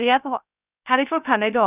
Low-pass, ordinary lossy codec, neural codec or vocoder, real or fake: 3.6 kHz; none; codec, 16 kHz, 0.3 kbps, FocalCodec; fake